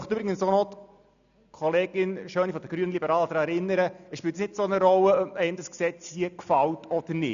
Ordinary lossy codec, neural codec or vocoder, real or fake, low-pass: MP3, 96 kbps; none; real; 7.2 kHz